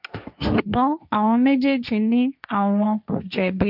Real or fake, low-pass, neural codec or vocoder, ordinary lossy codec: fake; 5.4 kHz; codec, 16 kHz, 1.1 kbps, Voila-Tokenizer; none